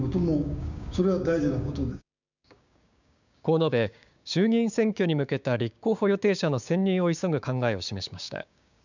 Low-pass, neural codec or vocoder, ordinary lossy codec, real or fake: 7.2 kHz; codec, 16 kHz, 6 kbps, DAC; none; fake